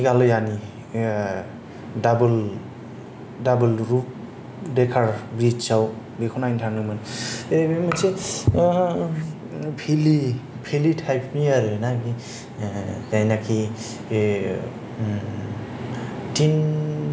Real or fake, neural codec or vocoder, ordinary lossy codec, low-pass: real; none; none; none